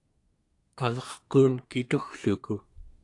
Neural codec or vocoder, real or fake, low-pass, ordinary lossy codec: codec, 24 kHz, 1 kbps, SNAC; fake; 10.8 kHz; AAC, 48 kbps